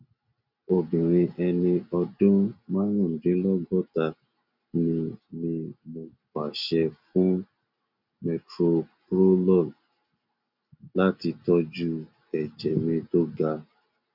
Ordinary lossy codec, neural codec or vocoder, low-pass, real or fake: none; none; 5.4 kHz; real